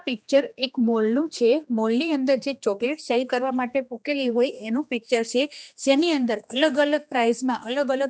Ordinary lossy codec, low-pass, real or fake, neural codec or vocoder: none; none; fake; codec, 16 kHz, 2 kbps, X-Codec, HuBERT features, trained on general audio